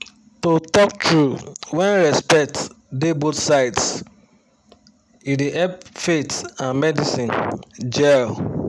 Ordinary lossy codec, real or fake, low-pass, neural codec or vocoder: none; real; none; none